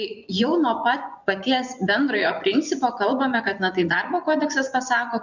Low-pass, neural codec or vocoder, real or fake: 7.2 kHz; vocoder, 24 kHz, 100 mel bands, Vocos; fake